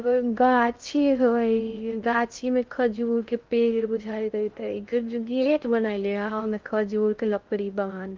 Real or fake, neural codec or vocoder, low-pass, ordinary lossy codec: fake; codec, 16 kHz in and 24 kHz out, 0.6 kbps, FocalCodec, streaming, 2048 codes; 7.2 kHz; Opus, 32 kbps